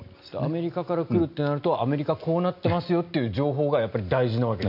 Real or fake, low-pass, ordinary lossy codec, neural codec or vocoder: real; 5.4 kHz; none; none